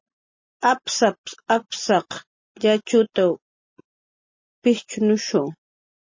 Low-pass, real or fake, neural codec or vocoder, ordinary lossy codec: 7.2 kHz; real; none; MP3, 32 kbps